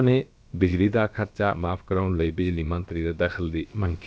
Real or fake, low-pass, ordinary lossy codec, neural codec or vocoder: fake; none; none; codec, 16 kHz, about 1 kbps, DyCAST, with the encoder's durations